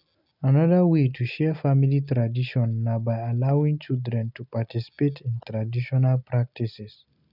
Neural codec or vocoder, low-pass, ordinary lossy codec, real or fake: none; 5.4 kHz; none; real